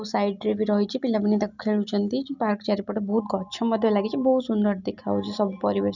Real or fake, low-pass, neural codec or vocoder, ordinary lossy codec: real; 7.2 kHz; none; none